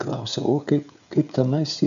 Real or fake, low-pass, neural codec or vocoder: fake; 7.2 kHz; codec, 16 kHz, 16 kbps, FreqCodec, smaller model